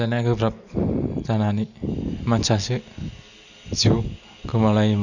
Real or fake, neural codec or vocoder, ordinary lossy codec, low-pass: real; none; none; 7.2 kHz